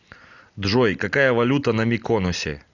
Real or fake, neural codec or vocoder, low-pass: real; none; 7.2 kHz